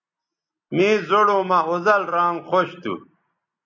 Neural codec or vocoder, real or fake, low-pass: none; real; 7.2 kHz